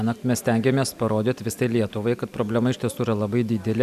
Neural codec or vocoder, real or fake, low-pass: none; real; 14.4 kHz